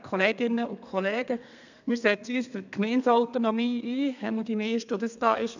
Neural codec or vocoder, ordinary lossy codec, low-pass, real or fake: codec, 32 kHz, 1.9 kbps, SNAC; none; 7.2 kHz; fake